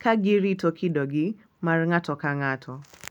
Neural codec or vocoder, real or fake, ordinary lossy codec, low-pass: none; real; none; 19.8 kHz